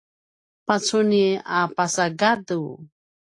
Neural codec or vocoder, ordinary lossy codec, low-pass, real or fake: none; AAC, 48 kbps; 10.8 kHz; real